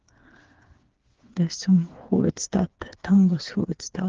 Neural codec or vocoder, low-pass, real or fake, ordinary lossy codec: codec, 16 kHz, 4 kbps, FreqCodec, smaller model; 7.2 kHz; fake; Opus, 16 kbps